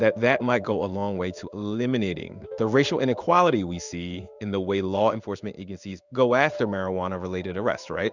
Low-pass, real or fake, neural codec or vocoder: 7.2 kHz; fake; codec, 16 kHz in and 24 kHz out, 1 kbps, XY-Tokenizer